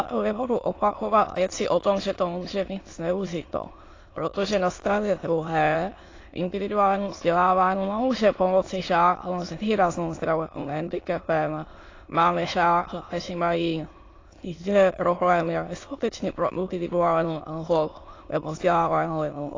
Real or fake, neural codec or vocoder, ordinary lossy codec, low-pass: fake; autoencoder, 22.05 kHz, a latent of 192 numbers a frame, VITS, trained on many speakers; AAC, 32 kbps; 7.2 kHz